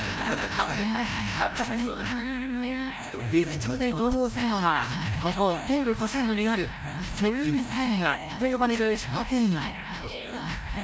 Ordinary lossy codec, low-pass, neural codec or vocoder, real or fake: none; none; codec, 16 kHz, 0.5 kbps, FreqCodec, larger model; fake